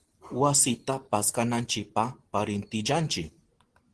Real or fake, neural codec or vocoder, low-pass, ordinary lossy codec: real; none; 10.8 kHz; Opus, 16 kbps